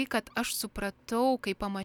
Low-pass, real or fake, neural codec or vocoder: 19.8 kHz; real; none